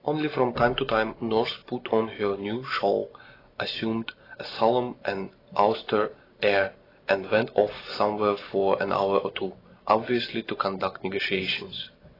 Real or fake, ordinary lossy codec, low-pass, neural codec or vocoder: real; AAC, 24 kbps; 5.4 kHz; none